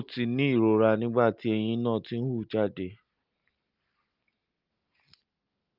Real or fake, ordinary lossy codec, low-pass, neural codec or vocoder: real; Opus, 32 kbps; 5.4 kHz; none